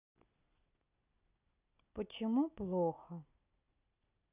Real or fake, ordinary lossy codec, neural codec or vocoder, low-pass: real; none; none; 3.6 kHz